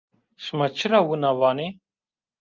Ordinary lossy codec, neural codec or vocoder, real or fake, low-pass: Opus, 32 kbps; none; real; 7.2 kHz